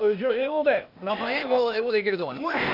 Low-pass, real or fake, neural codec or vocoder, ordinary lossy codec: 5.4 kHz; fake; codec, 16 kHz, 0.8 kbps, ZipCodec; none